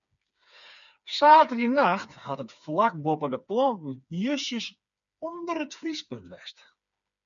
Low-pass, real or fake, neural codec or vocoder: 7.2 kHz; fake; codec, 16 kHz, 4 kbps, FreqCodec, smaller model